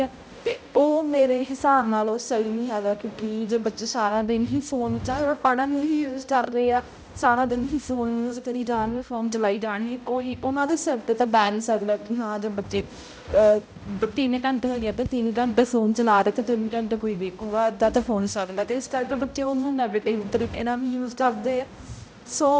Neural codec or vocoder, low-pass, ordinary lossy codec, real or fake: codec, 16 kHz, 0.5 kbps, X-Codec, HuBERT features, trained on balanced general audio; none; none; fake